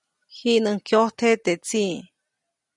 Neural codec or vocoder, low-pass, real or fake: none; 10.8 kHz; real